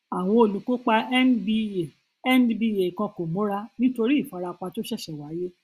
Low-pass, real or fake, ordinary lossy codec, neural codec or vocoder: 14.4 kHz; real; Opus, 64 kbps; none